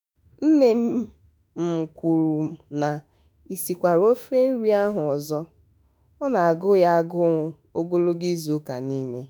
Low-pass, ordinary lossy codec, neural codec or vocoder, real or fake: none; none; autoencoder, 48 kHz, 32 numbers a frame, DAC-VAE, trained on Japanese speech; fake